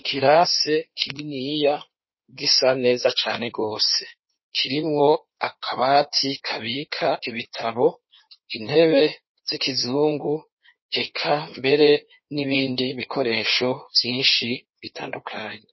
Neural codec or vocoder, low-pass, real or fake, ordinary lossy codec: codec, 16 kHz in and 24 kHz out, 1.1 kbps, FireRedTTS-2 codec; 7.2 kHz; fake; MP3, 24 kbps